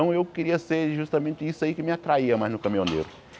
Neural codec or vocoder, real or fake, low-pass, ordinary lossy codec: none; real; none; none